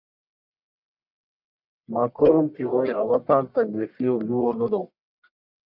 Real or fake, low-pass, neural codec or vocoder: fake; 5.4 kHz; codec, 44.1 kHz, 1.7 kbps, Pupu-Codec